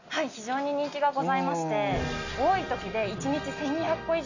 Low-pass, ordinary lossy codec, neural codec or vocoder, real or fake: 7.2 kHz; none; none; real